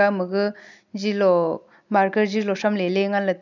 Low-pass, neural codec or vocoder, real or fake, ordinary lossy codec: 7.2 kHz; none; real; none